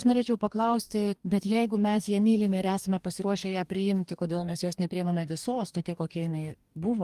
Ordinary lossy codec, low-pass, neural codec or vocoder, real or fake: Opus, 32 kbps; 14.4 kHz; codec, 44.1 kHz, 2.6 kbps, DAC; fake